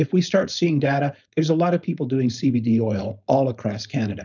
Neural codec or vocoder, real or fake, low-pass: codec, 16 kHz, 4.8 kbps, FACodec; fake; 7.2 kHz